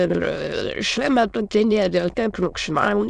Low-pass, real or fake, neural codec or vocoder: 9.9 kHz; fake; autoencoder, 22.05 kHz, a latent of 192 numbers a frame, VITS, trained on many speakers